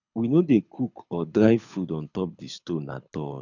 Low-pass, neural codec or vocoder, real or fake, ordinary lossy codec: 7.2 kHz; codec, 24 kHz, 6 kbps, HILCodec; fake; none